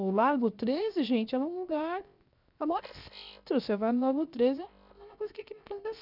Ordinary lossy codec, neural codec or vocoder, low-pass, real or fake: none; codec, 16 kHz, 0.7 kbps, FocalCodec; 5.4 kHz; fake